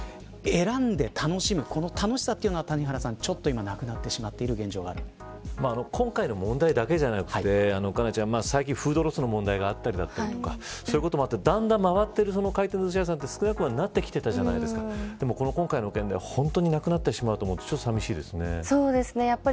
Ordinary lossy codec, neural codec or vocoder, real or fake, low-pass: none; none; real; none